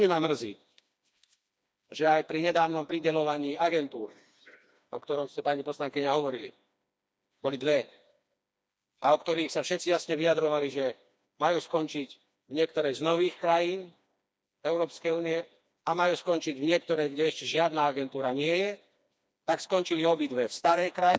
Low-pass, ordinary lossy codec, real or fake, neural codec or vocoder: none; none; fake; codec, 16 kHz, 2 kbps, FreqCodec, smaller model